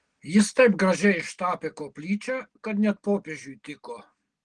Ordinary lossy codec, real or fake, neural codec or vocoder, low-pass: Opus, 16 kbps; real; none; 9.9 kHz